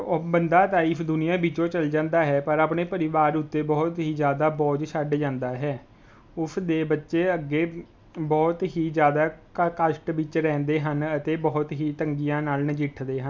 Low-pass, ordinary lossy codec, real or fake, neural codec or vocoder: none; none; real; none